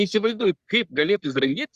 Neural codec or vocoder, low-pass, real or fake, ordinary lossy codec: codec, 44.1 kHz, 3.4 kbps, Pupu-Codec; 14.4 kHz; fake; Opus, 64 kbps